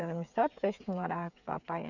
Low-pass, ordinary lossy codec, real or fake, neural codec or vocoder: 7.2 kHz; none; fake; codec, 16 kHz, 8 kbps, FreqCodec, smaller model